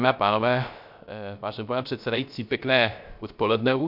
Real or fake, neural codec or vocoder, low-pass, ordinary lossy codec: fake; codec, 16 kHz, 0.3 kbps, FocalCodec; 5.4 kHz; MP3, 48 kbps